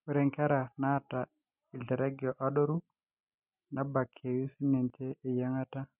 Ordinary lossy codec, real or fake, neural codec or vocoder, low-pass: none; real; none; 3.6 kHz